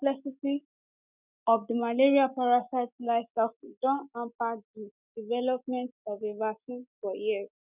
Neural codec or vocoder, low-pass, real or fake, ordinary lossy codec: none; 3.6 kHz; real; none